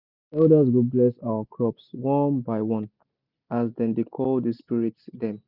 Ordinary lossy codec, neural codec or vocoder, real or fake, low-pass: Opus, 64 kbps; none; real; 5.4 kHz